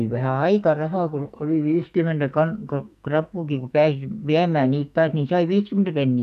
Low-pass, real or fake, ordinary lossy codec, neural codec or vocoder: 14.4 kHz; fake; none; codec, 44.1 kHz, 2.6 kbps, SNAC